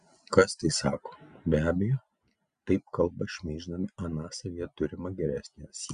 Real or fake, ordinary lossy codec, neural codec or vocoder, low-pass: real; Opus, 64 kbps; none; 9.9 kHz